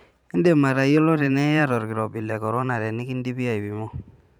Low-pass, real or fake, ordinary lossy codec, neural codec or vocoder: 19.8 kHz; fake; none; vocoder, 44.1 kHz, 128 mel bands every 512 samples, BigVGAN v2